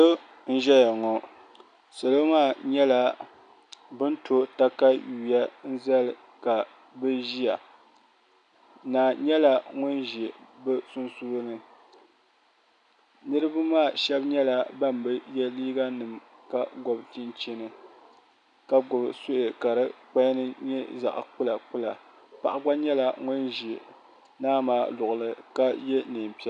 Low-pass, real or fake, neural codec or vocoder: 9.9 kHz; real; none